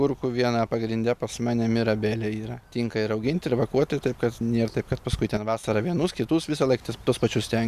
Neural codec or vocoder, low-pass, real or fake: none; 14.4 kHz; real